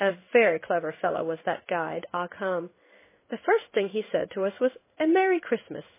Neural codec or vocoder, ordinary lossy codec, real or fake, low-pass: codec, 16 kHz in and 24 kHz out, 1 kbps, XY-Tokenizer; MP3, 16 kbps; fake; 3.6 kHz